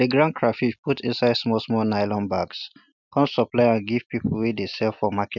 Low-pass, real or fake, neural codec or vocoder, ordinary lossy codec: 7.2 kHz; real; none; none